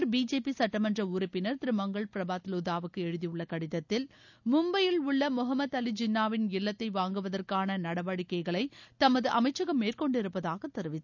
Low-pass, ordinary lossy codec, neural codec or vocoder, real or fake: 7.2 kHz; none; none; real